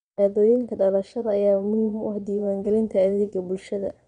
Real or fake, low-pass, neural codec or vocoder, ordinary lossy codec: fake; 9.9 kHz; vocoder, 22.05 kHz, 80 mel bands, Vocos; none